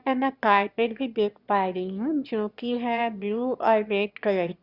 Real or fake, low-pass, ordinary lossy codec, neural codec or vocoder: fake; 5.4 kHz; Opus, 64 kbps; autoencoder, 22.05 kHz, a latent of 192 numbers a frame, VITS, trained on one speaker